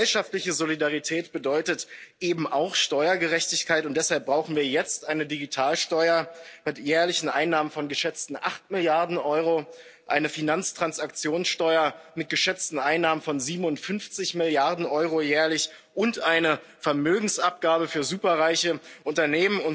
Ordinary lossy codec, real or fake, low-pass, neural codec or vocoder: none; real; none; none